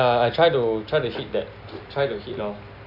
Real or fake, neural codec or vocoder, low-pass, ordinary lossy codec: real; none; 5.4 kHz; none